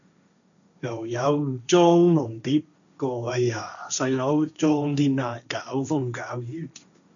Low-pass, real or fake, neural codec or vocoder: 7.2 kHz; fake; codec, 16 kHz, 1.1 kbps, Voila-Tokenizer